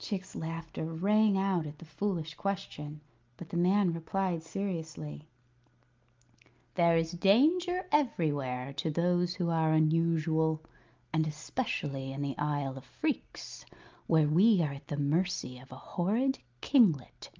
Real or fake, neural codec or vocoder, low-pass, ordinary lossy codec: real; none; 7.2 kHz; Opus, 24 kbps